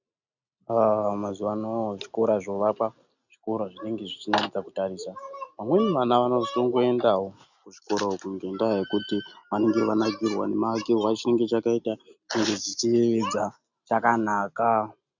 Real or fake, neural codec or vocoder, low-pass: real; none; 7.2 kHz